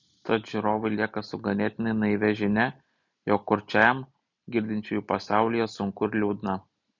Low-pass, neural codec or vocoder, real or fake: 7.2 kHz; none; real